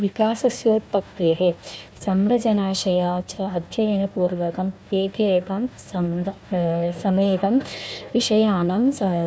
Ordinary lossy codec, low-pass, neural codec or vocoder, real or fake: none; none; codec, 16 kHz, 1 kbps, FunCodec, trained on Chinese and English, 50 frames a second; fake